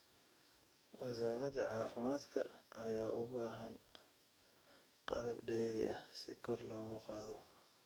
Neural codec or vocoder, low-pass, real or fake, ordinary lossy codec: codec, 44.1 kHz, 2.6 kbps, DAC; none; fake; none